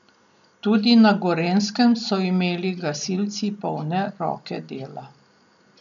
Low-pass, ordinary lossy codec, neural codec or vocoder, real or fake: 7.2 kHz; none; none; real